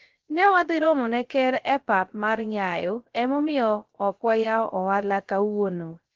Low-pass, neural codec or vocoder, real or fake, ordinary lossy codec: 7.2 kHz; codec, 16 kHz, 0.3 kbps, FocalCodec; fake; Opus, 16 kbps